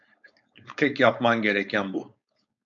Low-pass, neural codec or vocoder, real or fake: 7.2 kHz; codec, 16 kHz, 4.8 kbps, FACodec; fake